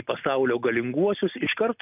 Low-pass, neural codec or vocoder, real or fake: 3.6 kHz; none; real